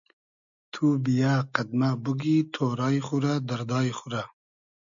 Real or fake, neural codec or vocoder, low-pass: real; none; 7.2 kHz